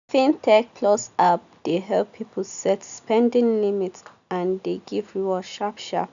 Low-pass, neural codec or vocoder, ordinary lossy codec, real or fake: 7.2 kHz; none; none; real